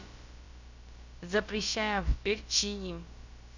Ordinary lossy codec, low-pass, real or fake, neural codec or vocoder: none; 7.2 kHz; fake; codec, 16 kHz, about 1 kbps, DyCAST, with the encoder's durations